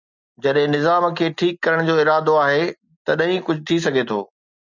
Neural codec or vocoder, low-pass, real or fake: none; 7.2 kHz; real